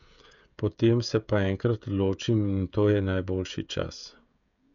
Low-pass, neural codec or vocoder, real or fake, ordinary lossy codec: 7.2 kHz; codec, 16 kHz, 16 kbps, FreqCodec, smaller model; fake; MP3, 96 kbps